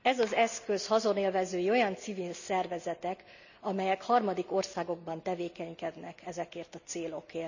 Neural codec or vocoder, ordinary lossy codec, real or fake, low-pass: none; none; real; 7.2 kHz